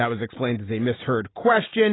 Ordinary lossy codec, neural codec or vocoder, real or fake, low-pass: AAC, 16 kbps; none; real; 7.2 kHz